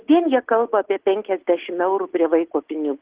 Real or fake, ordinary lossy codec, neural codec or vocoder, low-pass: real; Opus, 16 kbps; none; 3.6 kHz